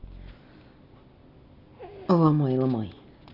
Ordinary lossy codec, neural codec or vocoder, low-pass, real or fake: none; none; 5.4 kHz; real